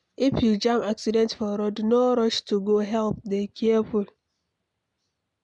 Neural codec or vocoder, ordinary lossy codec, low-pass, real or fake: none; MP3, 96 kbps; 10.8 kHz; real